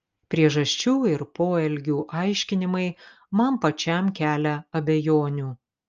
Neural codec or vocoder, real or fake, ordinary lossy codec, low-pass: none; real; Opus, 24 kbps; 7.2 kHz